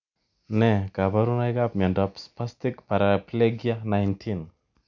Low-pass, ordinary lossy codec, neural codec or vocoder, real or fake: 7.2 kHz; none; none; real